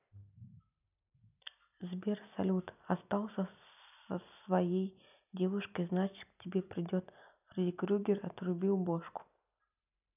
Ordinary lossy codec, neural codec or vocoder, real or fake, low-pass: none; none; real; 3.6 kHz